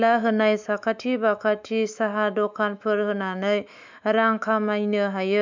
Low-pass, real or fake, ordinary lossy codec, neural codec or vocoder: 7.2 kHz; fake; MP3, 64 kbps; autoencoder, 48 kHz, 128 numbers a frame, DAC-VAE, trained on Japanese speech